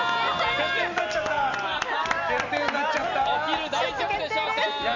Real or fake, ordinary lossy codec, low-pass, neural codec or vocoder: real; none; 7.2 kHz; none